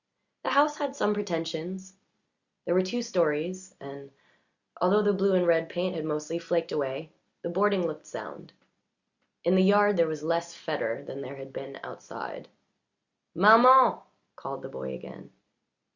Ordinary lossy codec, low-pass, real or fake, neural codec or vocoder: Opus, 64 kbps; 7.2 kHz; real; none